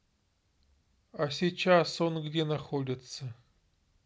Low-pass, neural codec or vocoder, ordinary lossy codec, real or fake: none; none; none; real